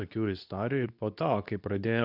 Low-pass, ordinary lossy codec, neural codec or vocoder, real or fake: 5.4 kHz; AAC, 48 kbps; codec, 24 kHz, 0.9 kbps, WavTokenizer, medium speech release version 2; fake